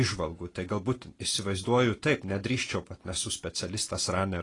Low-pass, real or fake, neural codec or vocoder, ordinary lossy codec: 10.8 kHz; real; none; AAC, 32 kbps